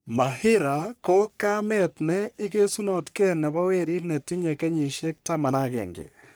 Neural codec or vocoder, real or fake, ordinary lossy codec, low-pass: codec, 44.1 kHz, 3.4 kbps, Pupu-Codec; fake; none; none